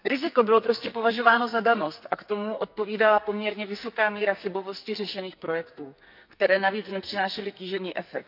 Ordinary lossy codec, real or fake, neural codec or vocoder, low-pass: none; fake; codec, 44.1 kHz, 2.6 kbps, SNAC; 5.4 kHz